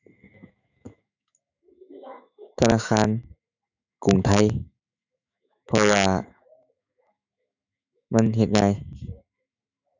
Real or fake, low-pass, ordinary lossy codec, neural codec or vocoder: fake; 7.2 kHz; none; autoencoder, 48 kHz, 128 numbers a frame, DAC-VAE, trained on Japanese speech